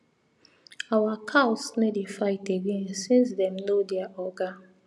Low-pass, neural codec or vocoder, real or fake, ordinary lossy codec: none; none; real; none